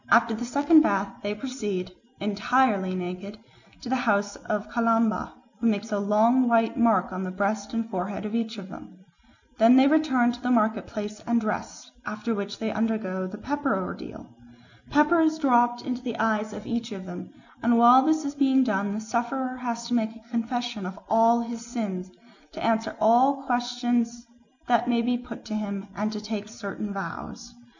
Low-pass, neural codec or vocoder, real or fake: 7.2 kHz; none; real